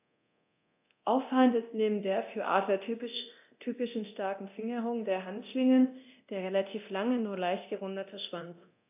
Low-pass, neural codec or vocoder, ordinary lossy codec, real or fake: 3.6 kHz; codec, 24 kHz, 0.9 kbps, DualCodec; AAC, 32 kbps; fake